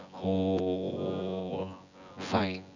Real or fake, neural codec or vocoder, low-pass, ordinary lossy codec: fake; vocoder, 24 kHz, 100 mel bands, Vocos; 7.2 kHz; none